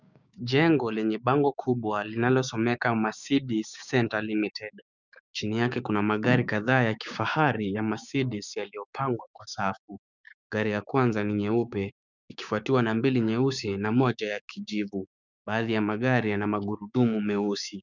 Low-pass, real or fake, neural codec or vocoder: 7.2 kHz; fake; codec, 16 kHz, 6 kbps, DAC